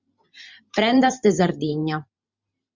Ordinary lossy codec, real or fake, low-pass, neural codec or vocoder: Opus, 64 kbps; fake; 7.2 kHz; vocoder, 44.1 kHz, 128 mel bands every 512 samples, BigVGAN v2